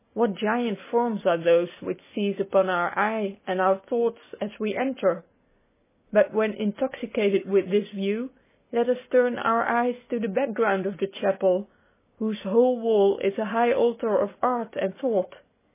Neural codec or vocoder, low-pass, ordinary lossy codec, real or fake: none; 3.6 kHz; MP3, 16 kbps; real